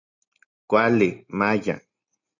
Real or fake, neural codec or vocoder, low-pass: real; none; 7.2 kHz